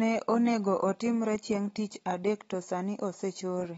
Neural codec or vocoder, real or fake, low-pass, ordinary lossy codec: none; real; 9.9 kHz; AAC, 24 kbps